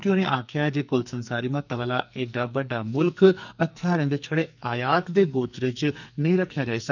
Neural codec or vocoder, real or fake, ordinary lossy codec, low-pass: codec, 44.1 kHz, 2.6 kbps, SNAC; fake; none; 7.2 kHz